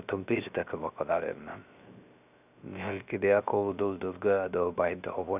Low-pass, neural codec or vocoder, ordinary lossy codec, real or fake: 3.6 kHz; codec, 16 kHz, 0.3 kbps, FocalCodec; none; fake